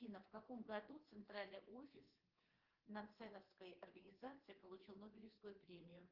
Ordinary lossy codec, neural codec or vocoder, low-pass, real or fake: Opus, 16 kbps; codec, 24 kHz, 3.1 kbps, DualCodec; 5.4 kHz; fake